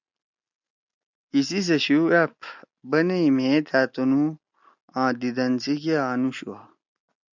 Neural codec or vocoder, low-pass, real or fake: none; 7.2 kHz; real